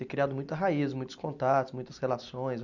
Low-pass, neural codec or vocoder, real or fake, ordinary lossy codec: 7.2 kHz; none; real; none